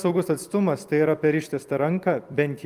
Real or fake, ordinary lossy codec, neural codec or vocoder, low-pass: fake; Opus, 32 kbps; vocoder, 44.1 kHz, 128 mel bands every 256 samples, BigVGAN v2; 14.4 kHz